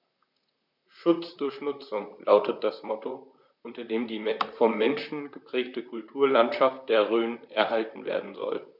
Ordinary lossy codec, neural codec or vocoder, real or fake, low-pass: none; vocoder, 44.1 kHz, 128 mel bands, Pupu-Vocoder; fake; 5.4 kHz